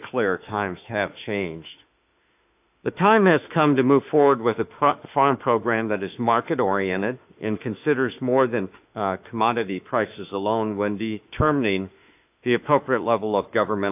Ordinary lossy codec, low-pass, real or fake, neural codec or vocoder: AAC, 32 kbps; 3.6 kHz; fake; autoencoder, 48 kHz, 32 numbers a frame, DAC-VAE, trained on Japanese speech